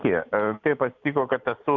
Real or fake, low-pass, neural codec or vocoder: real; 7.2 kHz; none